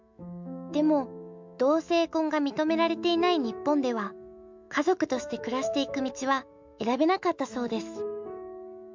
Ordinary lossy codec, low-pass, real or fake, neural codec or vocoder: MP3, 64 kbps; 7.2 kHz; fake; autoencoder, 48 kHz, 128 numbers a frame, DAC-VAE, trained on Japanese speech